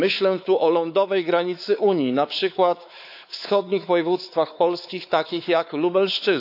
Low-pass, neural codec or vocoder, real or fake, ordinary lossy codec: 5.4 kHz; codec, 16 kHz, 4 kbps, X-Codec, WavLM features, trained on Multilingual LibriSpeech; fake; AAC, 48 kbps